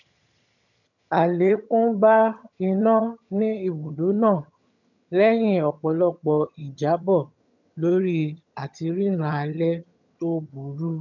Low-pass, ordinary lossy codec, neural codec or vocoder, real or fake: 7.2 kHz; none; vocoder, 22.05 kHz, 80 mel bands, HiFi-GAN; fake